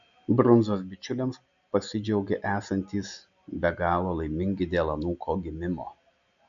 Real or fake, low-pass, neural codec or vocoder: real; 7.2 kHz; none